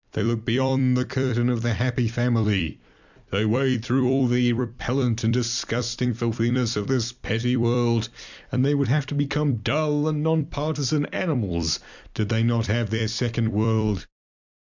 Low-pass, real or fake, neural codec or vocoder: 7.2 kHz; fake; vocoder, 44.1 kHz, 128 mel bands every 256 samples, BigVGAN v2